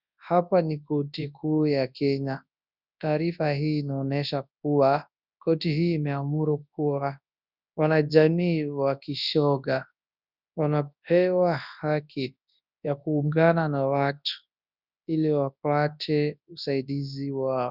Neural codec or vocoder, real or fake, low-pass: codec, 24 kHz, 0.9 kbps, WavTokenizer, large speech release; fake; 5.4 kHz